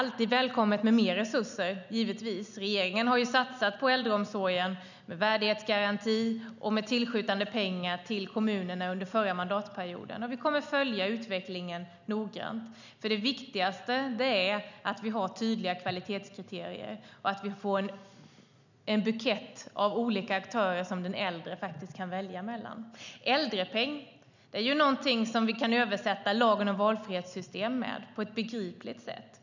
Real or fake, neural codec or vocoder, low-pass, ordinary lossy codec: real; none; 7.2 kHz; none